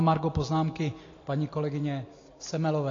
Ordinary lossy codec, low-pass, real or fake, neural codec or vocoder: AAC, 32 kbps; 7.2 kHz; real; none